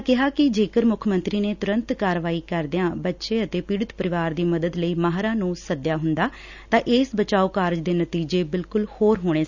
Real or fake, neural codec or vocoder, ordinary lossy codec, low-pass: real; none; none; 7.2 kHz